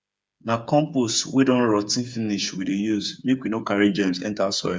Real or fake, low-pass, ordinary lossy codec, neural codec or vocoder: fake; none; none; codec, 16 kHz, 8 kbps, FreqCodec, smaller model